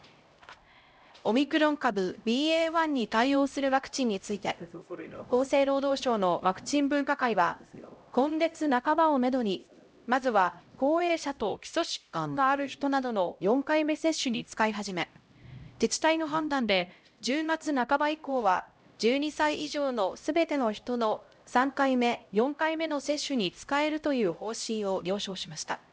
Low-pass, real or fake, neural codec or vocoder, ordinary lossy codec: none; fake; codec, 16 kHz, 0.5 kbps, X-Codec, HuBERT features, trained on LibriSpeech; none